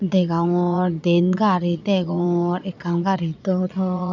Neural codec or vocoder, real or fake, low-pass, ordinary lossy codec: vocoder, 22.05 kHz, 80 mel bands, Vocos; fake; 7.2 kHz; none